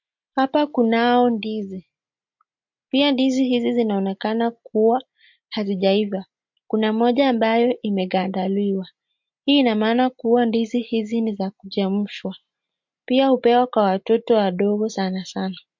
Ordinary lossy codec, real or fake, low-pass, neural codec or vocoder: MP3, 48 kbps; real; 7.2 kHz; none